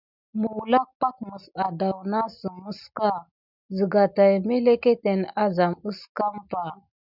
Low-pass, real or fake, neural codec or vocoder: 5.4 kHz; real; none